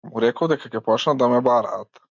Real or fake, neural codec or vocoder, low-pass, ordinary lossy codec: real; none; 7.2 kHz; MP3, 64 kbps